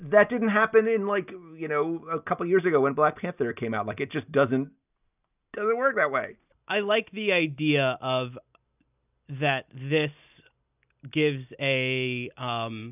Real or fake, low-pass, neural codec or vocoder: real; 3.6 kHz; none